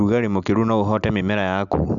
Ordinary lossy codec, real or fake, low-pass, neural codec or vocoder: none; real; 7.2 kHz; none